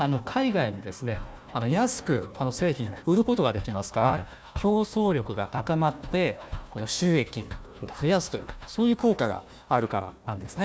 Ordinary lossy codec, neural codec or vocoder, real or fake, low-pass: none; codec, 16 kHz, 1 kbps, FunCodec, trained on Chinese and English, 50 frames a second; fake; none